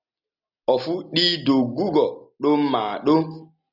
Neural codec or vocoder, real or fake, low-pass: none; real; 5.4 kHz